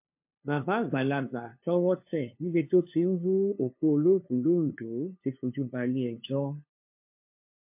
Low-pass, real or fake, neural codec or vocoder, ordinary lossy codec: 3.6 kHz; fake; codec, 16 kHz, 2 kbps, FunCodec, trained on LibriTTS, 25 frames a second; MP3, 32 kbps